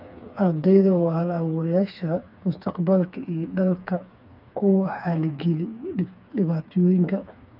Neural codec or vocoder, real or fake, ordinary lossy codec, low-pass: codec, 16 kHz, 4 kbps, FreqCodec, smaller model; fake; none; 5.4 kHz